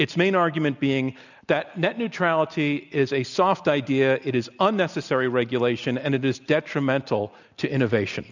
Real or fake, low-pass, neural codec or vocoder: real; 7.2 kHz; none